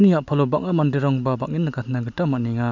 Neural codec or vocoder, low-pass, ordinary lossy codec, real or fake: none; 7.2 kHz; none; real